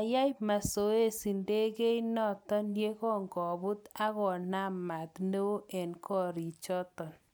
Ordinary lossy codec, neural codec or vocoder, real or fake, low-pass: none; none; real; none